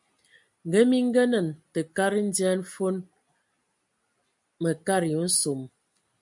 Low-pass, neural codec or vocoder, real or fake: 10.8 kHz; none; real